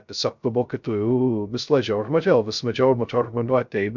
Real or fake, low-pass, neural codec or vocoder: fake; 7.2 kHz; codec, 16 kHz, 0.2 kbps, FocalCodec